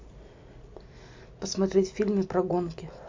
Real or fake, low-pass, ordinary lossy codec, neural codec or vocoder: real; 7.2 kHz; MP3, 48 kbps; none